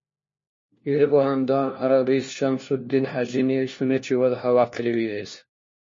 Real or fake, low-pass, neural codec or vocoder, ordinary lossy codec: fake; 7.2 kHz; codec, 16 kHz, 1 kbps, FunCodec, trained on LibriTTS, 50 frames a second; MP3, 32 kbps